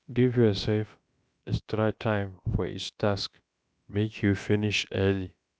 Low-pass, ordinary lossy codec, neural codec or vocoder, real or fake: none; none; codec, 16 kHz, about 1 kbps, DyCAST, with the encoder's durations; fake